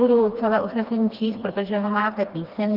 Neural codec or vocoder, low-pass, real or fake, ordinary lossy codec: codec, 16 kHz, 2 kbps, FreqCodec, smaller model; 5.4 kHz; fake; Opus, 32 kbps